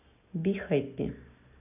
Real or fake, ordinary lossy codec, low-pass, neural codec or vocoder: real; none; 3.6 kHz; none